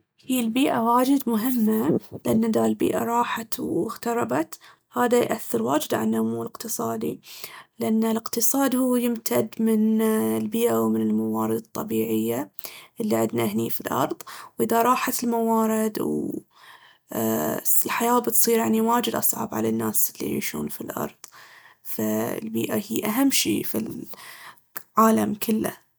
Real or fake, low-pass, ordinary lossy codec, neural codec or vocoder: real; none; none; none